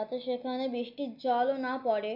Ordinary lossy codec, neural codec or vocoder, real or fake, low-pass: none; none; real; 5.4 kHz